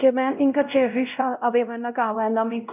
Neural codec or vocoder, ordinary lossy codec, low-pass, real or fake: codec, 16 kHz, 0.5 kbps, X-Codec, WavLM features, trained on Multilingual LibriSpeech; none; 3.6 kHz; fake